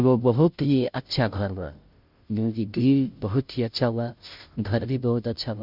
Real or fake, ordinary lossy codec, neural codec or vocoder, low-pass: fake; none; codec, 16 kHz, 0.5 kbps, FunCodec, trained on Chinese and English, 25 frames a second; 5.4 kHz